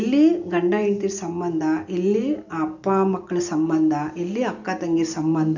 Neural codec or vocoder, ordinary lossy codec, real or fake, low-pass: none; none; real; 7.2 kHz